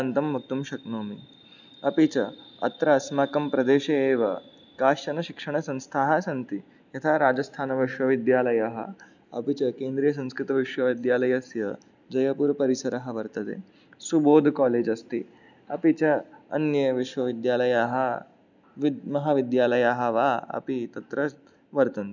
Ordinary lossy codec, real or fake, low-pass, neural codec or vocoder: none; real; 7.2 kHz; none